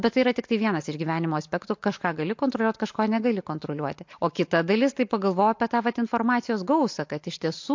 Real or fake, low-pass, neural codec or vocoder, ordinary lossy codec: real; 7.2 kHz; none; MP3, 48 kbps